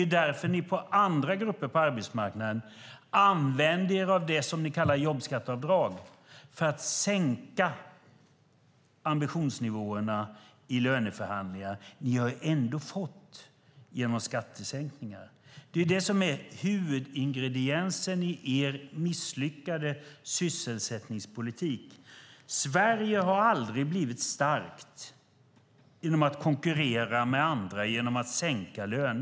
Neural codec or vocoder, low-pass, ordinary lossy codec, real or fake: none; none; none; real